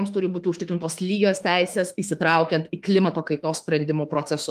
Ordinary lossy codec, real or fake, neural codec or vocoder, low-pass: Opus, 32 kbps; fake; autoencoder, 48 kHz, 32 numbers a frame, DAC-VAE, trained on Japanese speech; 14.4 kHz